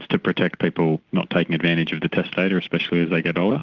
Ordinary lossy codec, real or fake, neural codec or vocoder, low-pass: Opus, 16 kbps; real; none; 7.2 kHz